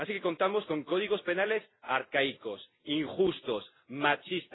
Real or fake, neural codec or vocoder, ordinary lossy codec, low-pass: real; none; AAC, 16 kbps; 7.2 kHz